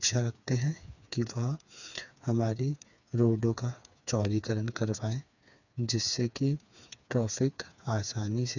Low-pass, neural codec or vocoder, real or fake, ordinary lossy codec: 7.2 kHz; codec, 16 kHz, 4 kbps, FreqCodec, smaller model; fake; none